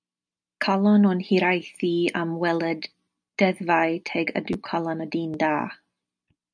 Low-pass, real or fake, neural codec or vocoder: 9.9 kHz; real; none